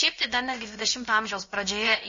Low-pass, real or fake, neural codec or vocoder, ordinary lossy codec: 7.2 kHz; fake; codec, 16 kHz, about 1 kbps, DyCAST, with the encoder's durations; MP3, 32 kbps